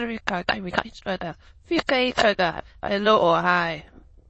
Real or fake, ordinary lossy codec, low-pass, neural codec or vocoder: fake; MP3, 32 kbps; 9.9 kHz; autoencoder, 22.05 kHz, a latent of 192 numbers a frame, VITS, trained on many speakers